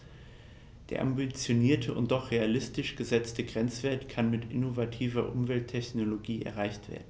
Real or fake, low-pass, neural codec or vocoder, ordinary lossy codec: real; none; none; none